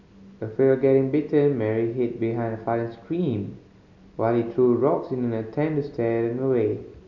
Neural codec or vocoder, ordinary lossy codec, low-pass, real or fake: none; none; 7.2 kHz; real